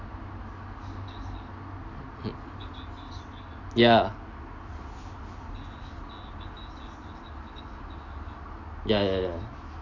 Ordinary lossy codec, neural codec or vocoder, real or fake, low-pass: AAC, 48 kbps; none; real; 7.2 kHz